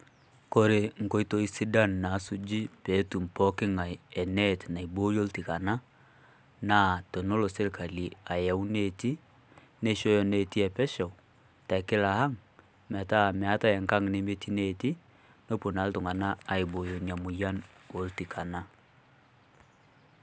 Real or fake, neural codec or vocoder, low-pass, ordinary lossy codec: real; none; none; none